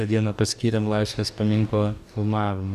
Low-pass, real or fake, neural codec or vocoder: 14.4 kHz; fake; codec, 44.1 kHz, 2.6 kbps, DAC